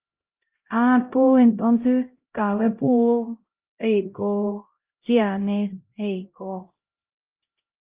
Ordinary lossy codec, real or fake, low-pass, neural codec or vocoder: Opus, 32 kbps; fake; 3.6 kHz; codec, 16 kHz, 0.5 kbps, X-Codec, HuBERT features, trained on LibriSpeech